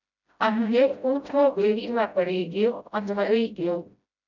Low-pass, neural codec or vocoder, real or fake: 7.2 kHz; codec, 16 kHz, 0.5 kbps, FreqCodec, smaller model; fake